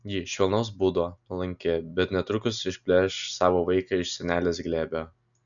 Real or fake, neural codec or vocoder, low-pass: real; none; 7.2 kHz